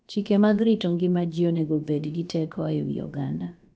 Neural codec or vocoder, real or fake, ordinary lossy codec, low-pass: codec, 16 kHz, about 1 kbps, DyCAST, with the encoder's durations; fake; none; none